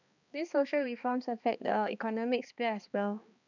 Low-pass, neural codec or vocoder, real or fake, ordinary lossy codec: 7.2 kHz; codec, 16 kHz, 2 kbps, X-Codec, HuBERT features, trained on balanced general audio; fake; none